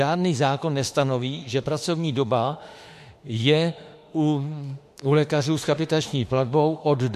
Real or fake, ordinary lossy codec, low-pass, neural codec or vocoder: fake; MP3, 64 kbps; 14.4 kHz; autoencoder, 48 kHz, 32 numbers a frame, DAC-VAE, trained on Japanese speech